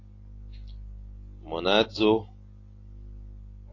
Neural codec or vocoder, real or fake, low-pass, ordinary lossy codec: none; real; 7.2 kHz; AAC, 32 kbps